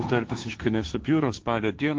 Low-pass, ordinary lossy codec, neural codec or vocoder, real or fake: 7.2 kHz; Opus, 24 kbps; codec, 16 kHz, 1.1 kbps, Voila-Tokenizer; fake